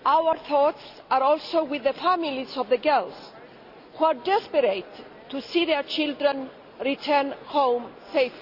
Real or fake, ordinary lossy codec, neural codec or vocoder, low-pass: real; none; none; 5.4 kHz